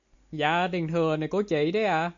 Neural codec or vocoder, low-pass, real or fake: none; 7.2 kHz; real